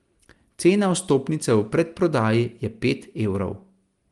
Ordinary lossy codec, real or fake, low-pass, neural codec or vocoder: Opus, 24 kbps; real; 10.8 kHz; none